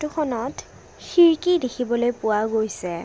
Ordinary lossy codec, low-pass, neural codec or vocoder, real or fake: none; none; none; real